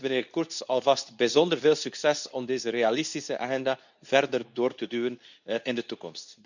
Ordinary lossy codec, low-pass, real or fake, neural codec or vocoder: none; 7.2 kHz; fake; codec, 24 kHz, 0.9 kbps, WavTokenizer, medium speech release version 2